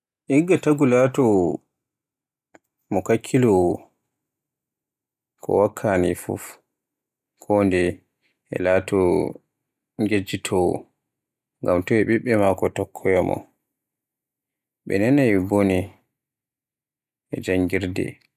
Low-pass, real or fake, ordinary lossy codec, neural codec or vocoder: 14.4 kHz; real; none; none